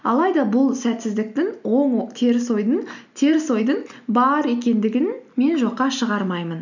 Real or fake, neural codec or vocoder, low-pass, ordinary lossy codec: real; none; 7.2 kHz; none